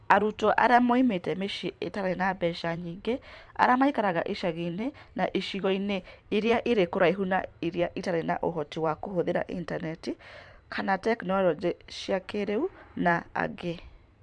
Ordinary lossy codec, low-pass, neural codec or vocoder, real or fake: none; 10.8 kHz; vocoder, 44.1 kHz, 128 mel bands every 512 samples, BigVGAN v2; fake